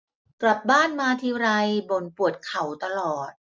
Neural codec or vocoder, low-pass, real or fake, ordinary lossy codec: none; none; real; none